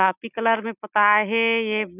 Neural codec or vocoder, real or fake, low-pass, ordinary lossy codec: none; real; 3.6 kHz; none